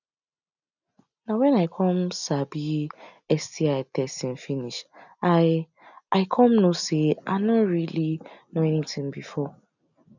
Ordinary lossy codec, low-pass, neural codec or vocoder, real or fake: none; 7.2 kHz; none; real